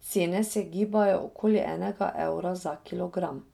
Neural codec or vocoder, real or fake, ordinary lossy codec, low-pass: none; real; none; 19.8 kHz